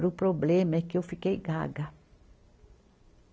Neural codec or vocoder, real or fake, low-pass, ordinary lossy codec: none; real; none; none